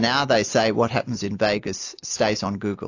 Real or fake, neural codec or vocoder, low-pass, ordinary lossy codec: real; none; 7.2 kHz; AAC, 48 kbps